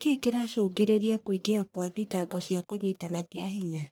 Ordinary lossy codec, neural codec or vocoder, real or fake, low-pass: none; codec, 44.1 kHz, 1.7 kbps, Pupu-Codec; fake; none